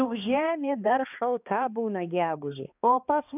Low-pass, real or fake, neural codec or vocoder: 3.6 kHz; fake; codec, 16 kHz, 2 kbps, X-Codec, HuBERT features, trained on balanced general audio